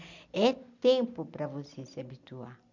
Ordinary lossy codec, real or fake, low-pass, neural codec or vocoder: none; real; 7.2 kHz; none